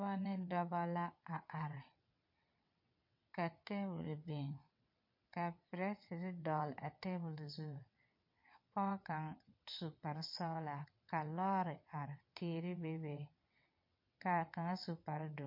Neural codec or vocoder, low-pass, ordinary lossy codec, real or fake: vocoder, 22.05 kHz, 80 mel bands, WaveNeXt; 5.4 kHz; MP3, 32 kbps; fake